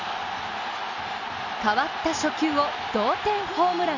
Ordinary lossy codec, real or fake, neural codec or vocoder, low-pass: none; real; none; 7.2 kHz